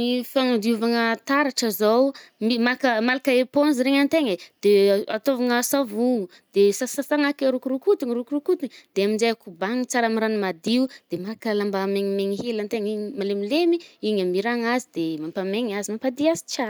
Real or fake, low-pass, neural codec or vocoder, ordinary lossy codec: real; none; none; none